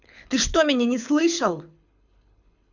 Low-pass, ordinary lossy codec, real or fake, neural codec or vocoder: 7.2 kHz; none; fake; codec, 24 kHz, 6 kbps, HILCodec